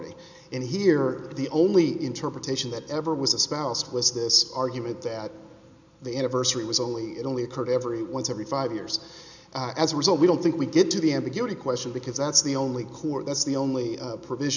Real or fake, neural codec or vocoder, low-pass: real; none; 7.2 kHz